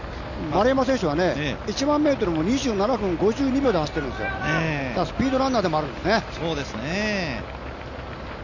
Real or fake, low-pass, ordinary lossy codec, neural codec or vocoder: real; 7.2 kHz; none; none